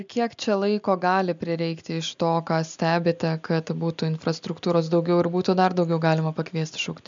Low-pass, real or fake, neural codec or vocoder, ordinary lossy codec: 7.2 kHz; real; none; MP3, 64 kbps